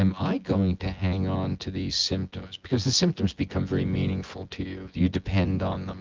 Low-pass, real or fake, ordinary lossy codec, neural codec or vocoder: 7.2 kHz; fake; Opus, 24 kbps; vocoder, 24 kHz, 100 mel bands, Vocos